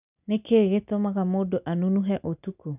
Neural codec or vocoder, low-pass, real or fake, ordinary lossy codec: none; 3.6 kHz; real; none